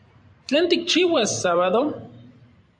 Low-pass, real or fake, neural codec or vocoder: 9.9 kHz; fake; vocoder, 44.1 kHz, 128 mel bands every 512 samples, BigVGAN v2